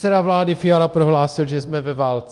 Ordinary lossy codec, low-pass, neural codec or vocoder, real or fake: Opus, 32 kbps; 10.8 kHz; codec, 24 kHz, 0.9 kbps, DualCodec; fake